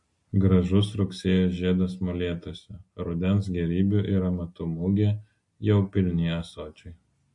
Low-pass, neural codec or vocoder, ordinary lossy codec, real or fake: 10.8 kHz; none; MP3, 48 kbps; real